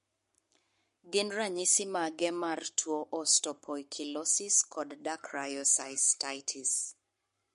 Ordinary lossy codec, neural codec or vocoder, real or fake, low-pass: MP3, 48 kbps; codec, 44.1 kHz, 7.8 kbps, Pupu-Codec; fake; 14.4 kHz